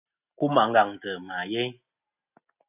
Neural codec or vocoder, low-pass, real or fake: none; 3.6 kHz; real